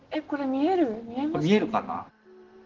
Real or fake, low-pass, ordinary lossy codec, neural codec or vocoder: real; 7.2 kHz; Opus, 16 kbps; none